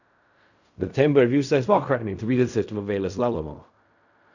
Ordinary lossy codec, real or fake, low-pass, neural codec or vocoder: none; fake; 7.2 kHz; codec, 16 kHz in and 24 kHz out, 0.4 kbps, LongCat-Audio-Codec, fine tuned four codebook decoder